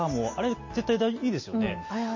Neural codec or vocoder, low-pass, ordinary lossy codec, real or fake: none; 7.2 kHz; MP3, 32 kbps; real